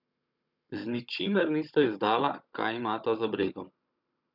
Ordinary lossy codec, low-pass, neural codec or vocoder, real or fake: none; 5.4 kHz; codec, 16 kHz, 16 kbps, FunCodec, trained on Chinese and English, 50 frames a second; fake